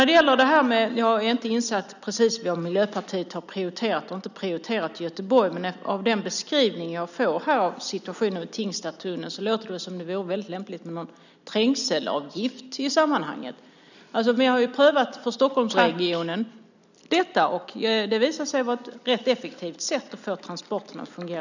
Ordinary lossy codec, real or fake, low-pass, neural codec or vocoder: none; real; 7.2 kHz; none